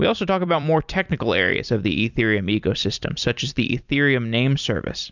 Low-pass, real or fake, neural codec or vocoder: 7.2 kHz; fake; vocoder, 44.1 kHz, 128 mel bands every 256 samples, BigVGAN v2